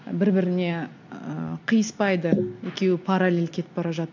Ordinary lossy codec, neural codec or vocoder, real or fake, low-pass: AAC, 48 kbps; none; real; 7.2 kHz